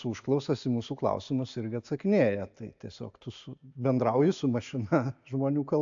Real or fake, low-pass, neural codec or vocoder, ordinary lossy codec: real; 7.2 kHz; none; AAC, 64 kbps